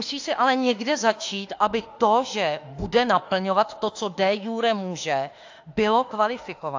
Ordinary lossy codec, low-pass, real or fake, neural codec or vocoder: AAC, 48 kbps; 7.2 kHz; fake; autoencoder, 48 kHz, 32 numbers a frame, DAC-VAE, trained on Japanese speech